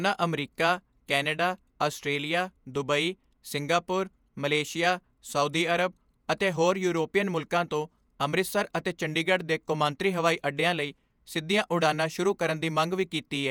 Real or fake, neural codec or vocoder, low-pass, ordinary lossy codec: fake; vocoder, 48 kHz, 128 mel bands, Vocos; none; none